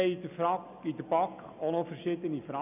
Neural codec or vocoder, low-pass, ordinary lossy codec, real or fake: none; 3.6 kHz; none; real